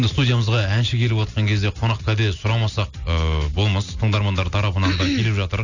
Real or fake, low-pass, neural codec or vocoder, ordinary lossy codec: real; 7.2 kHz; none; none